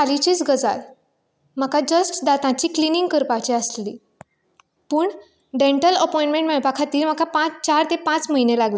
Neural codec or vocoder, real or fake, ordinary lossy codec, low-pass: none; real; none; none